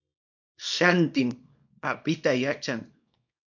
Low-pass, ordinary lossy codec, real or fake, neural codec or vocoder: 7.2 kHz; MP3, 48 kbps; fake; codec, 24 kHz, 0.9 kbps, WavTokenizer, small release